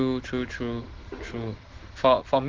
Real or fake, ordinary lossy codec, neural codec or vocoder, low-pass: real; Opus, 16 kbps; none; 7.2 kHz